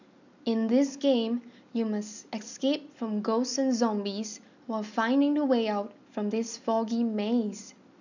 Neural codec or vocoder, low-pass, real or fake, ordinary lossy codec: none; 7.2 kHz; real; none